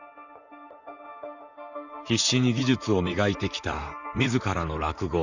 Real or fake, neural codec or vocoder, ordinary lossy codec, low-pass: fake; vocoder, 44.1 kHz, 128 mel bands, Pupu-Vocoder; none; 7.2 kHz